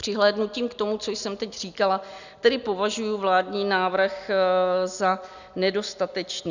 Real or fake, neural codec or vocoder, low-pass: real; none; 7.2 kHz